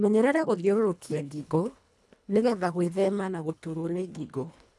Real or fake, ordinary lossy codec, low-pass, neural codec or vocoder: fake; none; none; codec, 24 kHz, 1.5 kbps, HILCodec